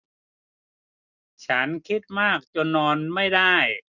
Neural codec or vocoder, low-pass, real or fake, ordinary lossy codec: none; 7.2 kHz; real; none